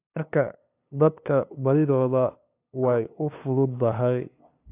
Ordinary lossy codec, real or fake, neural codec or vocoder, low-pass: AAC, 24 kbps; fake; codec, 16 kHz, 2 kbps, FunCodec, trained on LibriTTS, 25 frames a second; 3.6 kHz